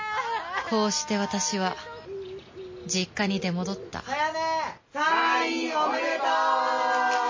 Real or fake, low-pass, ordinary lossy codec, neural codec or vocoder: real; 7.2 kHz; MP3, 32 kbps; none